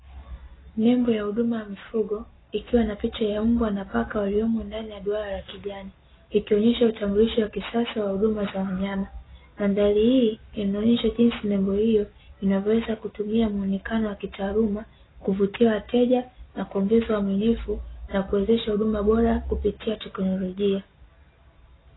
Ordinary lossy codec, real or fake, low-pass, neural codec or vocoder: AAC, 16 kbps; real; 7.2 kHz; none